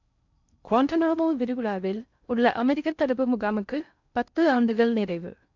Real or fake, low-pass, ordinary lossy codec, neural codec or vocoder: fake; 7.2 kHz; none; codec, 16 kHz in and 24 kHz out, 0.6 kbps, FocalCodec, streaming, 4096 codes